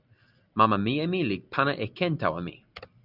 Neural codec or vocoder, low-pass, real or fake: none; 5.4 kHz; real